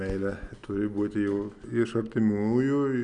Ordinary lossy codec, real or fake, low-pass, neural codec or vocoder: Opus, 64 kbps; real; 9.9 kHz; none